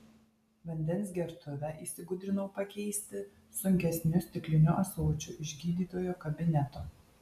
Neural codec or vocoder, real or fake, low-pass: none; real; 14.4 kHz